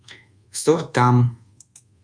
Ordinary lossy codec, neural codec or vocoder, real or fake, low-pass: Opus, 64 kbps; codec, 24 kHz, 1.2 kbps, DualCodec; fake; 9.9 kHz